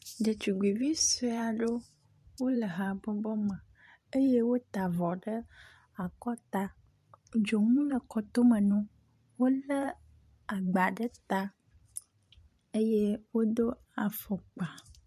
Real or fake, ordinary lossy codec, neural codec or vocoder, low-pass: fake; MP3, 64 kbps; vocoder, 44.1 kHz, 128 mel bands every 512 samples, BigVGAN v2; 14.4 kHz